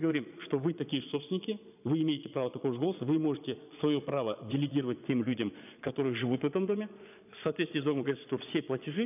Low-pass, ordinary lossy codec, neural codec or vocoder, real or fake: 3.6 kHz; none; vocoder, 22.05 kHz, 80 mel bands, Vocos; fake